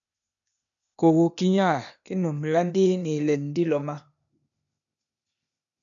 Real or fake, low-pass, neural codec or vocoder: fake; 7.2 kHz; codec, 16 kHz, 0.8 kbps, ZipCodec